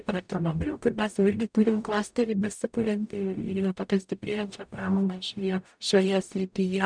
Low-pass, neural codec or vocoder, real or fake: 9.9 kHz; codec, 44.1 kHz, 0.9 kbps, DAC; fake